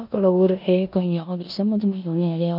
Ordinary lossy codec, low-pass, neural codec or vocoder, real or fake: none; 5.4 kHz; codec, 16 kHz in and 24 kHz out, 0.9 kbps, LongCat-Audio-Codec, four codebook decoder; fake